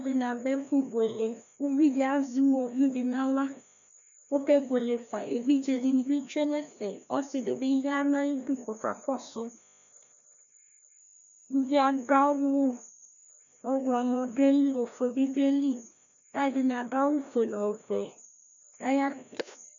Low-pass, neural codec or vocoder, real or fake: 7.2 kHz; codec, 16 kHz, 1 kbps, FreqCodec, larger model; fake